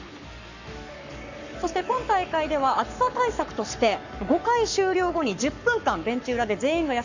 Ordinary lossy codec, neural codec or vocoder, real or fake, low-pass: none; codec, 44.1 kHz, 7.8 kbps, Pupu-Codec; fake; 7.2 kHz